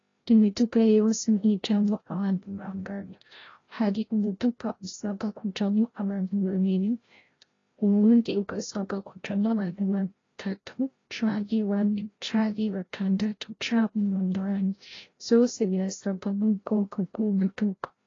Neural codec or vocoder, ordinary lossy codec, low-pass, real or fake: codec, 16 kHz, 0.5 kbps, FreqCodec, larger model; AAC, 32 kbps; 7.2 kHz; fake